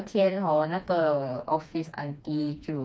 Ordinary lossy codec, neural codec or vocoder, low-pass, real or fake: none; codec, 16 kHz, 2 kbps, FreqCodec, smaller model; none; fake